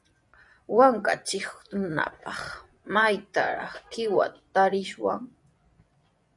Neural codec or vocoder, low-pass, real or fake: vocoder, 24 kHz, 100 mel bands, Vocos; 10.8 kHz; fake